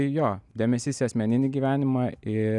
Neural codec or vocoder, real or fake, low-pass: none; real; 10.8 kHz